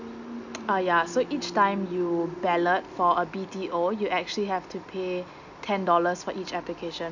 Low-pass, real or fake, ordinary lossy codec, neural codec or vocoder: 7.2 kHz; real; none; none